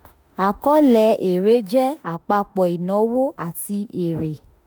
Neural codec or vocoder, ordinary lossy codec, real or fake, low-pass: autoencoder, 48 kHz, 32 numbers a frame, DAC-VAE, trained on Japanese speech; none; fake; none